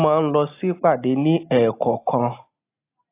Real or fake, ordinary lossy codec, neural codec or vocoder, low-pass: real; AAC, 24 kbps; none; 3.6 kHz